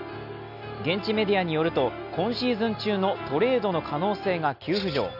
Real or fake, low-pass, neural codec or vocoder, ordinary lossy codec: real; 5.4 kHz; none; none